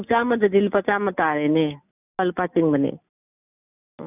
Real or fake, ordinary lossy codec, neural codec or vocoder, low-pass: real; none; none; 3.6 kHz